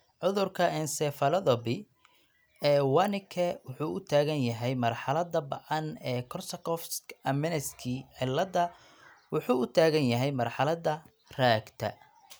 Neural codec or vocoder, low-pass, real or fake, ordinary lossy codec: none; none; real; none